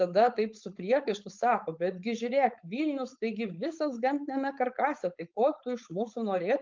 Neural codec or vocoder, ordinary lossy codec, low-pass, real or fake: codec, 16 kHz, 4.8 kbps, FACodec; Opus, 24 kbps; 7.2 kHz; fake